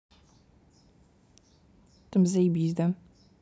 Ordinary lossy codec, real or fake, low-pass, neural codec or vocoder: none; real; none; none